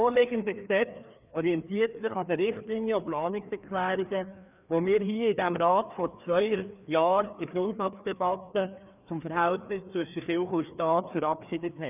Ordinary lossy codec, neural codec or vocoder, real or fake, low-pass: none; codec, 16 kHz, 2 kbps, FreqCodec, larger model; fake; 3.6 kHz